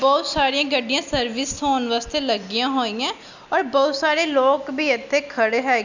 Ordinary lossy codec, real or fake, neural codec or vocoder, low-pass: none; real; none; 7.2 kHz